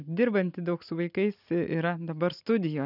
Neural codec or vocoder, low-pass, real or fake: none; 5.4 kHz; real